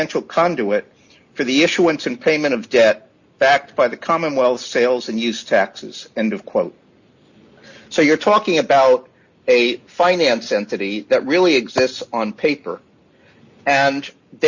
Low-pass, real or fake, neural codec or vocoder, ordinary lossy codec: 7.2 kHz; real; none; Opus, 64 kbps